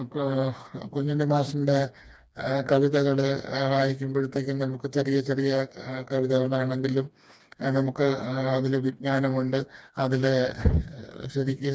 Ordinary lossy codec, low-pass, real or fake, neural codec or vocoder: none; none; fake; codec, 16 kHz, 2 kbps, FreqCodec, smaller model